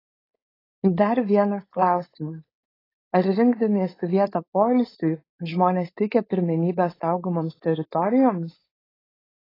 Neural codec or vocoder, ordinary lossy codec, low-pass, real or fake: codec, 16 kHz, 4.8 kbps, FACodec; AAC, 24 kbps; 5.4 kHz; fake